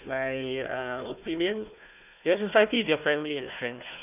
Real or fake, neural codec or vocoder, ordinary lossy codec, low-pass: fake; codec, 16 kHz, 1 kbps, FunCodec, trained on Chinese and English, 50 frames a second; none; 3.6 kHz